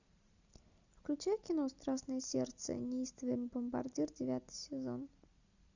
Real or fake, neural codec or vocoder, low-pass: real; none; 7.2 kHz